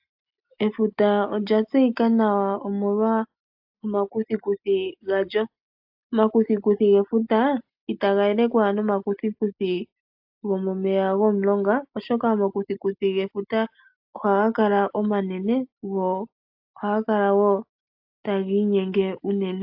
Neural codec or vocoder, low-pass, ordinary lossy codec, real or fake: none; 5.4 kHz; AAC, 48 kbps; real